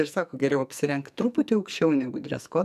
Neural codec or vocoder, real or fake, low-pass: codec, 44.1 kHz, 2.6 kbps, SNAC; fake; 14.4 kHz